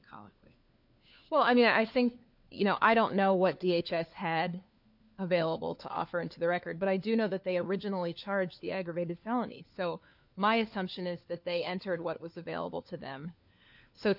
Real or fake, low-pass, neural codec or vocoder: fake; 5.4 kHz; codec, 16 kHz, 4 kbps, FunCodec, trained on LibriTTS, 50 frames a second